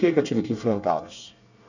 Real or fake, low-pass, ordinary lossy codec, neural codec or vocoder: fake; 7.2 kHz; none; codec, 24 kHz, 1 kbps, SNAC